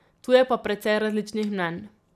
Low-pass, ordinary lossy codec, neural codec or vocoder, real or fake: 14.4 kHz; none; none; real